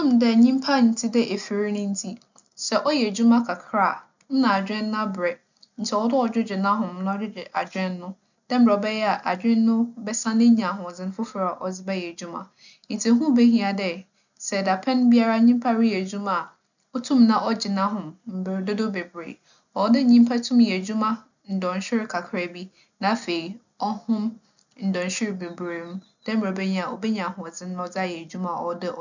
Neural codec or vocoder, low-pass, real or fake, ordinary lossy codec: none; 7.2 kHz; real; none